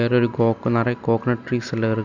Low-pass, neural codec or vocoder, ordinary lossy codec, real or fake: 7.2 kHz; none; none; real